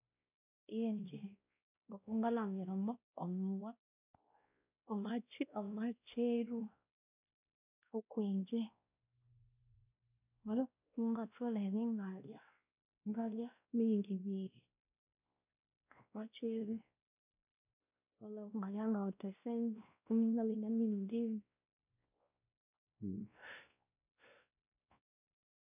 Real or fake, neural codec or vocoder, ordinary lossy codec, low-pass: fake; codec, 16 kHz, 1 kbps, X-Codec, WavLM features, trained on Multilingual LibriSpeech; none; 3.6 kHz